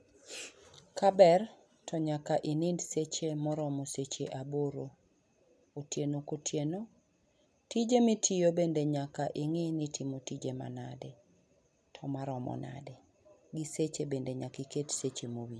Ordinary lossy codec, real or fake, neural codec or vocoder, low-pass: none; real; none; none